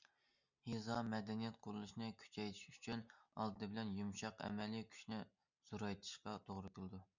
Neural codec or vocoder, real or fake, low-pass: none; real; 7.2 kHz